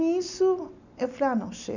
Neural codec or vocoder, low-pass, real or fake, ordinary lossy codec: none; 7.2 kHz; real; none